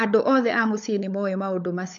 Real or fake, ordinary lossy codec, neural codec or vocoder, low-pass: fake; AAC, 64 kbps; codec, 16 kHz, 16 kbps, FunCodec, trained on LibriTTS, 50 frames a second; 7.2 kHz